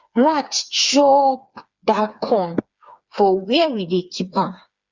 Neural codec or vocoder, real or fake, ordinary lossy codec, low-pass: codec, 16 kHz, 4 kbps, FreqCodec, smaller model; fake; Opus, 64 kbps; 7.2 kHz